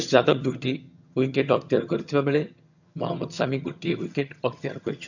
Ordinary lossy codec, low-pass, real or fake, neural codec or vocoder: none; 7.2 kHz; fake; vocoder, 22.05 kHz, 80 mel bands, HiFi-GAN